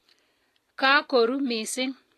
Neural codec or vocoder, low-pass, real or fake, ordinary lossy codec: none; 14.4 kHz; real; AAC, 48 kbps